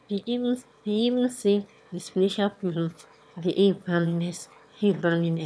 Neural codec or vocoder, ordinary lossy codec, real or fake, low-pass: autoencoder, 22.05 kHz, a latent of 192 numbers a frame, VITS, trained on one speaker; none; fake; none